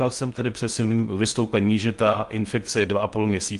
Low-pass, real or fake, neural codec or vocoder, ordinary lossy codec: 10.8 kHz; fake; codec, 16 kHz in and 24 kHz out, 0.6 kbps, FocalCodec, streaming, 4096 codes; Opus, 32 kbps